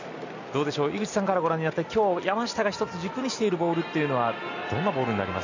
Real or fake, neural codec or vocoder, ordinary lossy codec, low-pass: real; none; none; 7.2 kHz